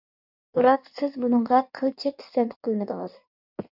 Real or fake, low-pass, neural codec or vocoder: fake; 5.4 kHz; codec, 16 kHz in and 24 kHz out, 1.1 kbps, FireRedTTS-2 codec